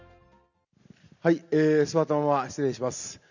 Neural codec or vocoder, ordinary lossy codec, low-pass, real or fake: none; none; 7.2 kHz; real